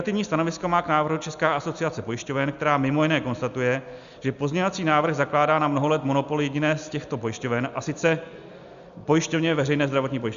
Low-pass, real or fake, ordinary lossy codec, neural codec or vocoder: 7.2 kHz; real; Opus, 64 kbps; none